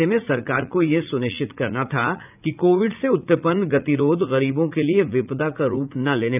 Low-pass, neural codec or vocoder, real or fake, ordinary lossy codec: 3.6 kHz; vocoder, 44.1 kHz, 80 mel bands, Vocos; fake; none